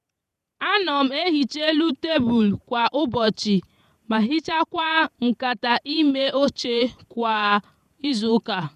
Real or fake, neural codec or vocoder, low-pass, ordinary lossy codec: fake; vocoder, 48 kHz, 128 mel bands, Vocos; 14.4 kHz; none